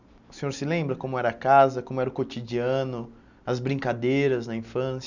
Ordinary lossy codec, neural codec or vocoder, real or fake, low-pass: none; none; real; 7.2 kHz